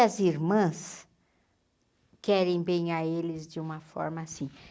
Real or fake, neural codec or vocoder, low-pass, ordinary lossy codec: real; none; none; none